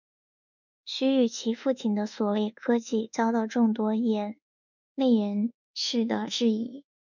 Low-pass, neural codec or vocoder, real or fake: 7.2 kHz; codec, 24 kHz, 1.2 kbps, DualCodec; fake